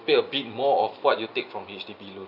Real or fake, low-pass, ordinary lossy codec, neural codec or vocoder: real; 5.4 kHz; none; none